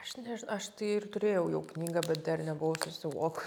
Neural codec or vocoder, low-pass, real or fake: none; 19.8 kHz; real